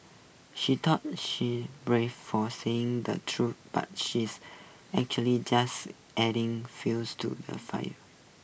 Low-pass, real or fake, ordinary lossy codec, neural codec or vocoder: none; real; none; none